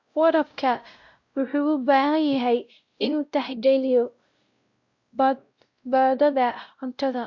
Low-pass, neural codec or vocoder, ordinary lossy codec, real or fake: 7.2 kHz; codec, 16 kHz, 0.5 kbps, X-Codec, WavLM features, trained on Multilingual LibriSpeech; Opus, 64 kbps; fake